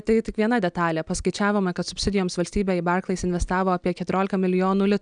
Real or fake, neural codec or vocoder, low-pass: real; none; 9.9 kHz